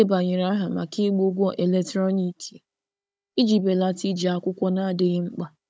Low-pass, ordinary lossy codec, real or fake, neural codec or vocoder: none; none; fake; codec, 16 kHz, 16 kbps, FunCodec, trained on Chinese and English, 50 frames a second